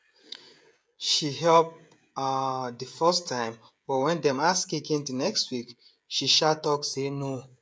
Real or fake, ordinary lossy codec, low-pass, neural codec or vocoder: fake; none; none; codec, 16 kHz, 16 kbps, FreqCodec, smaller model